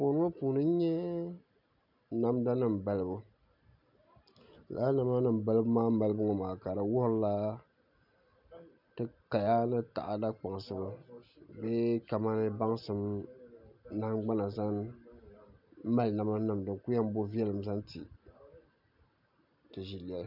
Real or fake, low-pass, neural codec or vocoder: real; 5.4 kHz; none